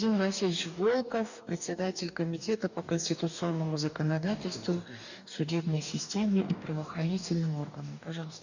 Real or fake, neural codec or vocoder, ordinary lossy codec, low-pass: fake; codec, 44.1 kHz, 2.6 kbps, DAC; none; 7.2 kHz